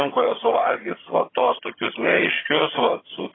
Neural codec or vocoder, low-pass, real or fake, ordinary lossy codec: vocoder, 22.05 kHz, 80 mel bands, HiFi-GAN; 7.2 kHz; fake; AAC, 16 kbps